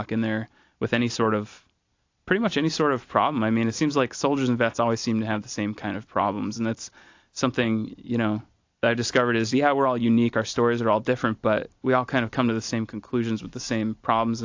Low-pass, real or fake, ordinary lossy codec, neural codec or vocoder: 7.2 kHz; real; AAC, 48 kbps; none